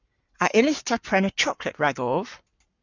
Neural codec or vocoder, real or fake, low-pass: codec, 44.1 kHz, 7.8 kbps, Pupu-Codec; fake; 7.2 kHz